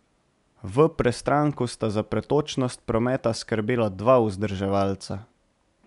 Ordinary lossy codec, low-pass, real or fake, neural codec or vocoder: none; 10.8 kHz; real; none